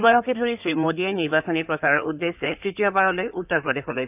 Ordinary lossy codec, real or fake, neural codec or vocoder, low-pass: none; fake; codec, 16 kHz in and 24 kHz out, 2.2 kbps, FireRedTTS-2 codec; 3.6 kHz